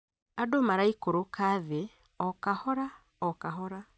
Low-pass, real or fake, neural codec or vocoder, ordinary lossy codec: none; real; none; none